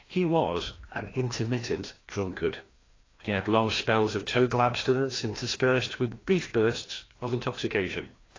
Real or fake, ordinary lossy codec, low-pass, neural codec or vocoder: fake; AAC, 32 kbps; 7.2 kHz; codec, 16 kHz, 1 kbps, FreqCodec, larger model